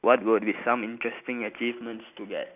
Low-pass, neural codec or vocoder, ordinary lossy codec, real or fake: 3.6 kHz; none; none; real